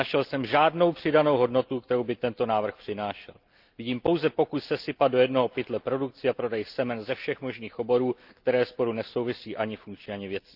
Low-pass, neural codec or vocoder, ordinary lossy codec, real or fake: 5.4 kHz; none; Opus, 24 kbps; real